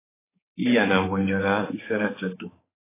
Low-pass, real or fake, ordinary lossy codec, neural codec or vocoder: 3.6 kHz; fake; AAC, 16 kbps; codec, 16 kHz, 4 kbps, X-Codec, HuBERT features, trained on balanced general audio